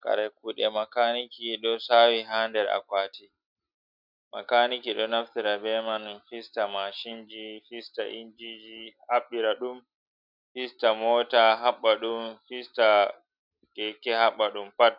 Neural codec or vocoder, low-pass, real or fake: none; 5.4 kHz; real